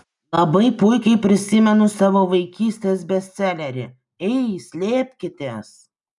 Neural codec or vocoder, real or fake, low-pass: none; real; 10.8 kHz